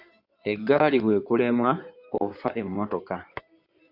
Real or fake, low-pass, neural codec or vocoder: fake; 5.4 kHz; codec, 16 kHz in and 24 kHz out, 2.2 kbps, FireRedTTS-2 codec